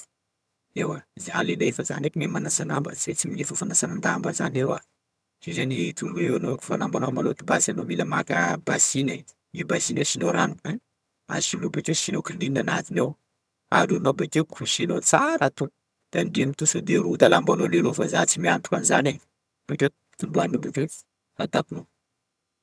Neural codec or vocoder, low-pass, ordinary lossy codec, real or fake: vocoder, 22.05 kHz, 80 mel bands, HiFi-GAN; none; none; fake